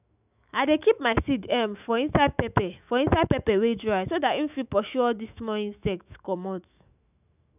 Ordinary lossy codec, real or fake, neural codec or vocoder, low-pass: none; fake; autoencoder, 48 kHz, 128 numbers a frame, DAC-VAE, trained on Japanese speech; 3.6 kHz